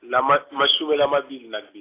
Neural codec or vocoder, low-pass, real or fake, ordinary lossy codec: none; 3.6 kHz; real; AAC, 24 kbps